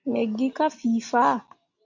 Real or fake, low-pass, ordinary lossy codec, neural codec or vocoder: real; 7.2 kHz; AAC, 48 kbps; none